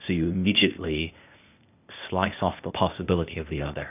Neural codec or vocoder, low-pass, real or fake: codec, 16 kHz, 0.8 kbps, ZipCodec; 3.6 kHz; fake